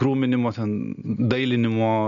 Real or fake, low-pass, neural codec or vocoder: real; 7.2 kHz; none